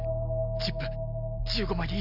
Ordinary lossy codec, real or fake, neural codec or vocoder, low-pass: Opus, 64 kbps; real; none; 5.4 kHz